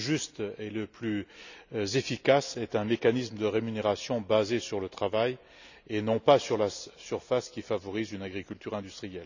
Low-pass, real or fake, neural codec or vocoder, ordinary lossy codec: 7.2 kHz; real; none; none